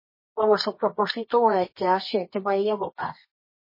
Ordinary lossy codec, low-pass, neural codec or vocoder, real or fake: MP3, 24 kbps; 5.4 kHz; codec, 24 kHz, 0.9 kbps, WavTokenizer, medium music audio release; fake